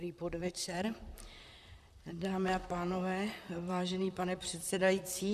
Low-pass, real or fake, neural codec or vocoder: 14.4 kHz; fake; vocoder, 44.1 kHz, 128 mel bands, Pupu-Vocoder